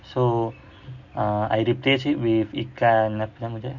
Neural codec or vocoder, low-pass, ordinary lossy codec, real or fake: none; 7.2 kHz; none; real